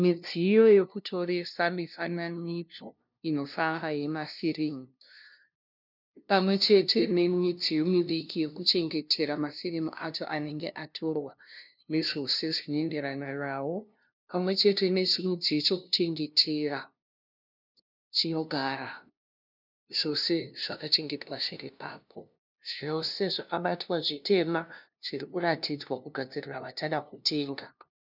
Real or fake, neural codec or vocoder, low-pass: fake; codec, 16 kHz, 0.5 kbps, FunCodec, trained on LibriTTS, 25 frames a second; 5.4 kHz